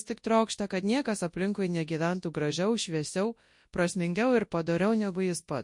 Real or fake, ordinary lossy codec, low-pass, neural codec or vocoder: fake; MP3, 48 kbps; 10.8 kHz; codec, 24 kHz, 0.9 kbps, WavTokenizer, large speech release